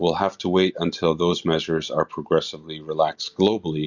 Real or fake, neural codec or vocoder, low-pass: real; none; 7.2 kHz